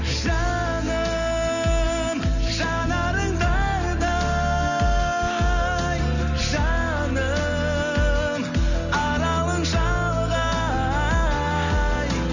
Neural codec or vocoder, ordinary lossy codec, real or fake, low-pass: none; none; real; 7.2 kHz